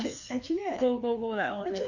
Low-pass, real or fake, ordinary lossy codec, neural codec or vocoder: 7.2 kHz; fake; none; codec, 16 kHz, 2 kbps, FreqCodec, larger model